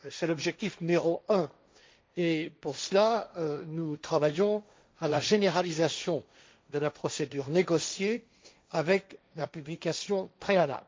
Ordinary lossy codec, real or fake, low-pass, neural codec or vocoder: none; fake; none; codec, 16 kHz, 1.1 kbps, Voila-Tokenizer